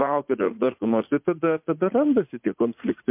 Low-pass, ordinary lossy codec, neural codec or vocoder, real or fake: 3.6 kHz; MP3, 32 kbps; vocoder, 44.1 kHz, 80 mel bands, Vocos; fake